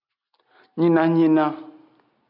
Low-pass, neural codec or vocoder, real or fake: 5.4 kHz; none; real